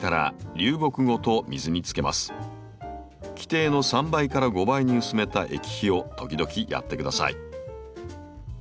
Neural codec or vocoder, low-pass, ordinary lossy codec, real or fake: none; none; none; real